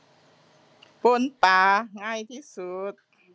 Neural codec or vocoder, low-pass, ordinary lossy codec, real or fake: none; none; none; real